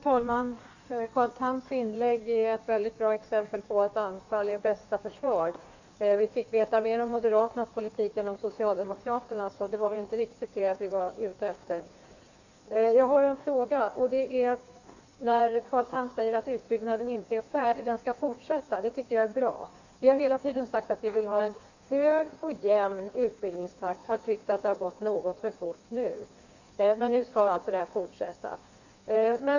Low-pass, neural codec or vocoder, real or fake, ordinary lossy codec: 7.2 kHz; codec, 16 kHz in and 24 kHz out, 1.1 kbps, FireRedTTS-2 codec; fake; none